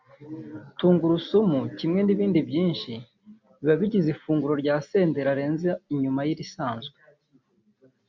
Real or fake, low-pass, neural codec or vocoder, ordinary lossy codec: real; 7.2 kHz; none; MP3, 64 kbps